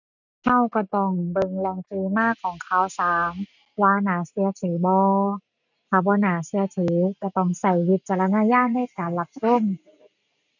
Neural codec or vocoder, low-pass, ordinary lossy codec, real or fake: none; 7.2 kHz; none; real